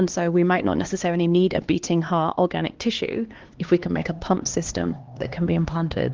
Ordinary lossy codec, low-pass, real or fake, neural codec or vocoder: Opus, 16 kbps; 7.2 kHz; fake; codec, 16 kHz, 2 kbps, X-Codec, HuBERT features, trained on LibriSpeech